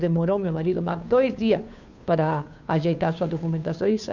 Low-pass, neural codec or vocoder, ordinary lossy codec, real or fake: 7.2 kHz; codec, 16 kHz, 2 kbps, FunCodec, trained on Chinese and English, 25 frames a second; none; fake